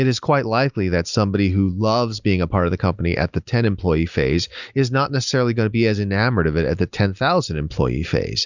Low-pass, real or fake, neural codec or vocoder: 7.2 kHz; real; none